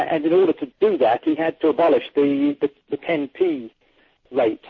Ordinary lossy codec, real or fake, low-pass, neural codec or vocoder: MP3, 32 kbps; real; 7.2 kHz; none